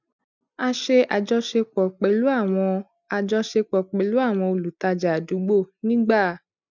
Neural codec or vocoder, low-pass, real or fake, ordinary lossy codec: none; 7.2 kHz; real; none